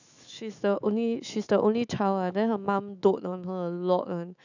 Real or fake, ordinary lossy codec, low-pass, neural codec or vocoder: fake; none; 7.2 kHz; autoencoder, 48 kHz, 128 numbers a frame, DAC-VAE, trained on Japanese speech